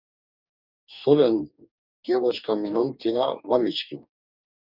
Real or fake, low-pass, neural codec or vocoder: fake; 5.4 kHz; codec, 44.1 kHz, 2.6 kbps, DAC